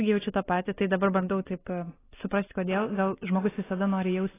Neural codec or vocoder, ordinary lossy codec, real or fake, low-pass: none; AAC, 16 kbps; real; 3.6 kHz